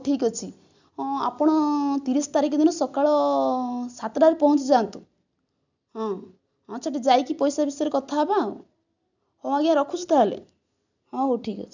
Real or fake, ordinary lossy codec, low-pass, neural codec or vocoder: real; none; 7.2 kHz; none